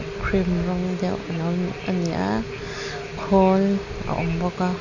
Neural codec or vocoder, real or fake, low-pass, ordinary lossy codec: none; real; 7.2 kHz; none